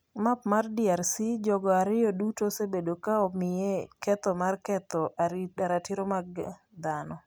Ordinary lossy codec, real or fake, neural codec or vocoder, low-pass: none; real; none; none